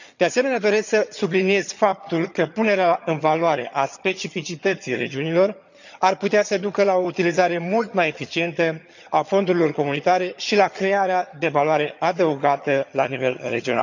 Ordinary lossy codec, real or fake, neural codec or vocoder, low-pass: none; fake; vocoder, 22.05 kHz, 80 mel bands, HiFi-GAN; 7.2 kHz